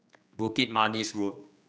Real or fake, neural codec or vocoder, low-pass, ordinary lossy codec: fake; codec, 16 kHz, 2 kbps, X-Codec, HuBERT features, trained on general audio; none; none